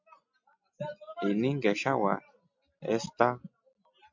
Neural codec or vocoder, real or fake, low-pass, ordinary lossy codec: none; real; 7.2 kHz; MP3, 64 kbps